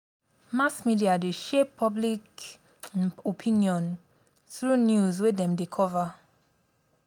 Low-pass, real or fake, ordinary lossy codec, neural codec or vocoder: 19.8 kHz; real; none; none